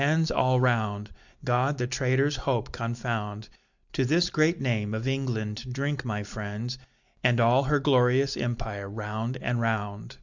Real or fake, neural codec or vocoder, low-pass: real; none; 7.2 kHz